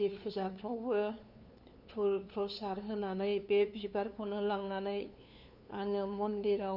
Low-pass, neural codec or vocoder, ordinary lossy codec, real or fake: 5.4 kHz; codec, 16 kHz, 2 kbps, FunCodec, trained on LibriTTS, 25 frames a second; none; fake